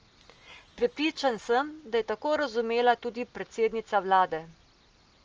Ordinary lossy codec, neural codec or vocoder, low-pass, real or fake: Opus, 24 kbps; none; 7.2 kHz; real